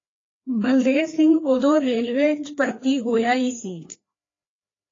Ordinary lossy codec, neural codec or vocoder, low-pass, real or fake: AAC, 32 kbps; codec, 16 kHz, 2 kbps, FreqCodec, larger model; 7.2 kHz; fake